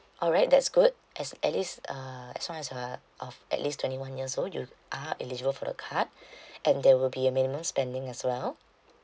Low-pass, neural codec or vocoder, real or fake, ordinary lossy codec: none; none; real; none